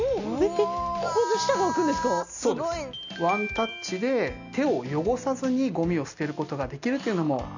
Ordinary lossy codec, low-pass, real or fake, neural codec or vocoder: none; 7.2 kHz; real; none